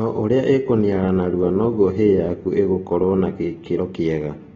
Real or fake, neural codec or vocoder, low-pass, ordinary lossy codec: real; none; 7.2 kHz; AAC, 24 kbps